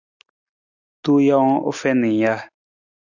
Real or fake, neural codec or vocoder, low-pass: real; none; 7.2 kHz